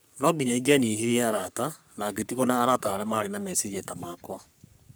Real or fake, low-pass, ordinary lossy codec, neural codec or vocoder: fake; none; none; codec, 44.1 kHz, 3.4 kbps, Pupu-Codec